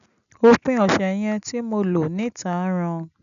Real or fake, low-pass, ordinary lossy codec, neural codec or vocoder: real; 7.2 kHz; none; none